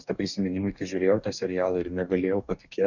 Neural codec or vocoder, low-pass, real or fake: codec, 44.1 kHz, 2.6 kbps, DAC; 7.2 kHz; fake